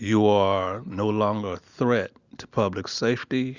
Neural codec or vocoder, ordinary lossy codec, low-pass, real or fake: none; Opus, 64 kbps; 7.2 kHz; real